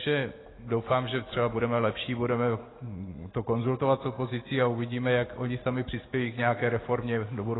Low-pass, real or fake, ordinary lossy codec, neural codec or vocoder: 7.2 kHz; real; AAC, 16 kbps; none